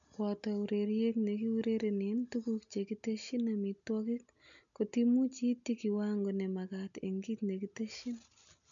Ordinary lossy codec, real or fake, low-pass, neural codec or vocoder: none; real; 7.2 kHz; none